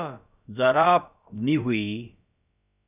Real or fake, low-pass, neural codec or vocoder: fake; 3.6 kHz; codec, 16 kHz, about 1 kbps, DyCAST, with the encoder's durations